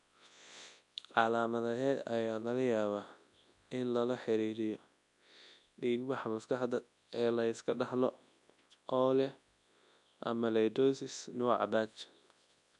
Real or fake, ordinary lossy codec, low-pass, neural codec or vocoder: fake; none; 10.8 kHz; codec, 24 kHz, 0.9 kbps, WavTokenizer, large speech release